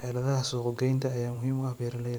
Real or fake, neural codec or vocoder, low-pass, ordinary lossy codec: fake; vocoder, 44.1 kHz, 128 mel bands every 512 samples, BigVGAN v2; none; none